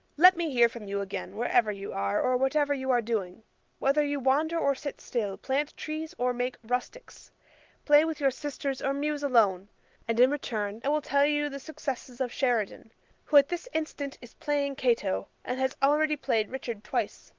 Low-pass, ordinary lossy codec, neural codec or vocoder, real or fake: 7.2 kHz; Opus, 32 kbps; none; real